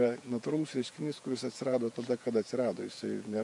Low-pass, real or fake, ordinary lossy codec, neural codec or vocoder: 10.8 kHz; fake; MP3, 48 kbps; vocoder, 48 kHz, 128 mel bands, Vocos